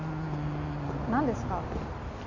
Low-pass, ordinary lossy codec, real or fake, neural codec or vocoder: 7.2 kHz; none; real; none